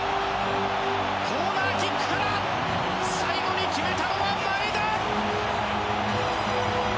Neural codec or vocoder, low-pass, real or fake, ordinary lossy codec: none; none; real; none